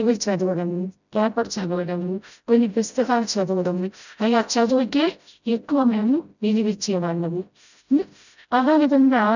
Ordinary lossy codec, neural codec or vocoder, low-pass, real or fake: none; codec, 16 kHz, 0.5 kbps, FreqCodec, smaller model; 7.2 kHz; fake